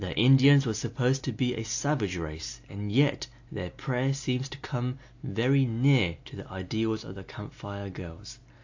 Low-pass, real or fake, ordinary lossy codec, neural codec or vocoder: 7.2 kHz; real; AAC, 48 kbps; none